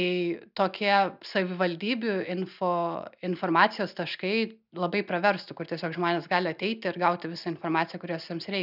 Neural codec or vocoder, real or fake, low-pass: none; real; 5.4 kHz